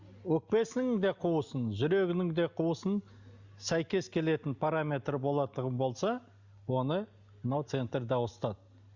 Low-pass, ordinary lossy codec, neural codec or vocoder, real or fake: 7.2 kHz; Opus, 64 kbps; none; real